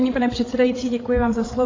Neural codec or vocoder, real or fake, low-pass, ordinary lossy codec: codec, 16 kHz, 8 kbps, FreqCodec, larger model; fake; 7.2 kHz; AAC, 32 kbps